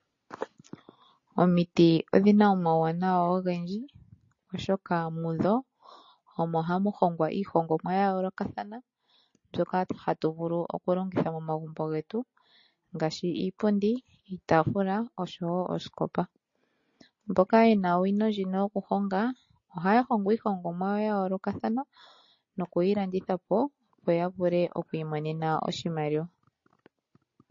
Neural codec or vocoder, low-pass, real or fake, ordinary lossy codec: none; 7.2 kHz; real; MP3, 32 kbps